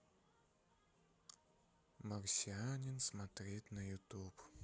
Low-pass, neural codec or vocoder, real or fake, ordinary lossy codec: none; none; real; none